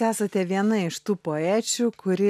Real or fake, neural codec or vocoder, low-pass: real; none; 14.4 kHz